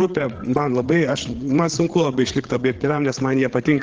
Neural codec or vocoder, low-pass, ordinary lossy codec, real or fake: codec, 16 kHz, 8 kbps, FreqCodec, larger model; 7.2 kHz; Opus, 16 kbps; fake